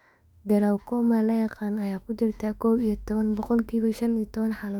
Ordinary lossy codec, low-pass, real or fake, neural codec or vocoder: none; 19.8 kHz; fake; autoencoder, 48 kHz, 32 numbers a frame, DAC-VAE, trained on Japanese speech